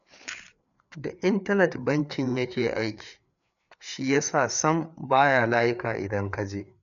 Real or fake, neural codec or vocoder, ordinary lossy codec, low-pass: fake; codec, 16 kHz, 4 kbps, FreqCodec, larger model; none; 7.2 kHz